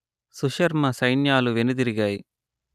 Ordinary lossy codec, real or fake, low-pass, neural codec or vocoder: none; real; 14.4 kHz; none